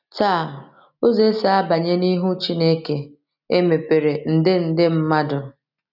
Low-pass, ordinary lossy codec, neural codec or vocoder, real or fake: 5.4 kHz; none; none; real